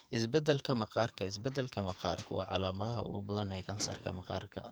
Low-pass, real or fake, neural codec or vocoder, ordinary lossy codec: none; fake; codec, 44.1 kHz, 2.6 kbps, SNAC; none